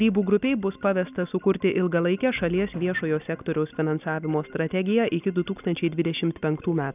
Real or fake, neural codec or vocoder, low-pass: real; none; 3.6 kHz